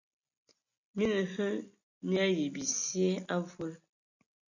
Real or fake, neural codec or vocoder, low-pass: real; none; 7.2 kHz